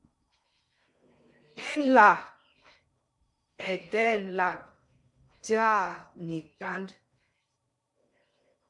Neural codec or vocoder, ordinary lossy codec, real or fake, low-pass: codec, 16 kHz in and 24 kHz out, 0.6 kbps, FocalCodec, streaming, 4096 codes; MP3, 64 kbps; fake; 10.8 kHz